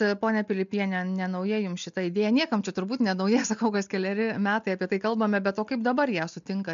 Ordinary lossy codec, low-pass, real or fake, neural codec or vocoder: MP3, 64 kbps; 7.2 kHz; real; none